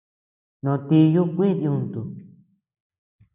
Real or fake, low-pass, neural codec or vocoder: real; 3.6 kHz; none